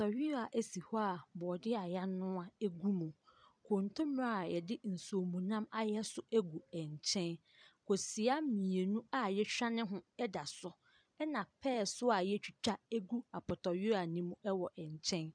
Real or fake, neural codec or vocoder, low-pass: fake; vocoder, 44.1 kHz, 128 mel bands every 256 samples, BigVGAN v2; 9.9 kHz